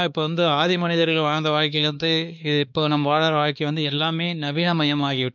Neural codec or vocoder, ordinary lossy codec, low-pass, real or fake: codec, 16 kHz, 2 kbps, X-Codec, WavLM features, trained on Multilingual LibriSpeech; none; none; fake